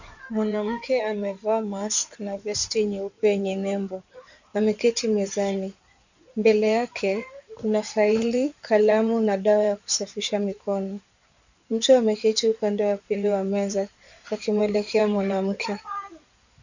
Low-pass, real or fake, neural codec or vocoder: 7.2 kHz; fake; codec, 16 kHz in and 24 kHz out, 2.2 kbps, FireRedTTS-2 codec